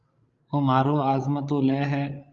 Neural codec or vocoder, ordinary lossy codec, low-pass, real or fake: codec, 16 kHz, 16 kbps, FreqCodec, larger model; Opus, 32 kbps; 7.2 kHz; fake